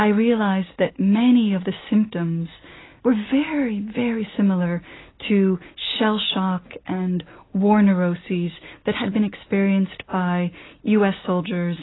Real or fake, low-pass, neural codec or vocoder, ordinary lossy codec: real; 7.2 kHz; none; AAC, 16 kbps